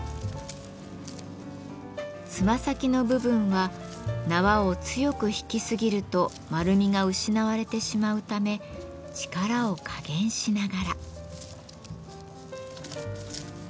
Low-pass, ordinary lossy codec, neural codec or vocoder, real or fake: none; none; none; real